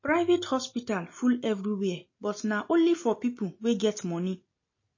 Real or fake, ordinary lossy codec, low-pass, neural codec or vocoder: real; MP3, 32 kbps; 7.2 kHz; none